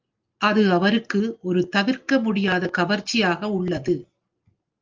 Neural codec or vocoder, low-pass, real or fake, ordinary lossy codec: none; 7.2 kHz; real; Opus, 32 kbps